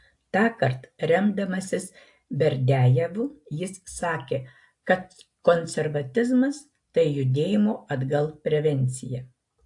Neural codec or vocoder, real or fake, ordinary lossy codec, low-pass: none; real; AAC, 64 kbps; 10.8 kHz